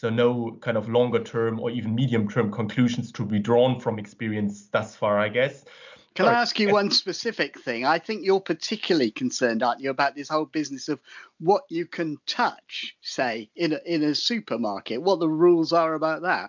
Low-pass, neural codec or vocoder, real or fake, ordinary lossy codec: 7.2 kHz; none; real; MP3, 64 kbps